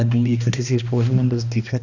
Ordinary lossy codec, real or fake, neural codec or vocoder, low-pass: none; fake; codec, 16 kHz, 1 kbps, X-Codec, HuBERT features, trained on balanced general audio; 7.2 kHz